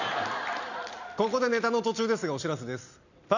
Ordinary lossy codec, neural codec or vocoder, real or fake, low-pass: none; none; real; 7.2 kHz